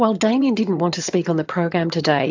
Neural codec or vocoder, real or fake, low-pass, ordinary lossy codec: vocoder, 22.05 kHz, 80 mel bands, HiFi-GAN; fake; 7.2 kHz; AAC, 48 kbps